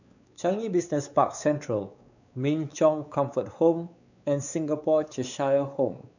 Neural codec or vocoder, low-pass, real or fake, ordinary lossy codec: codec, 16 kHz, 4 kbps, X-Codec, WavLM features, trained on Multilingual LibriSpeech; 7.2 kHz; fake; none